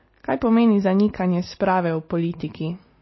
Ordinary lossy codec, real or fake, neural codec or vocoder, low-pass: MP3, 24 kbps; real; none; 7.2 kHz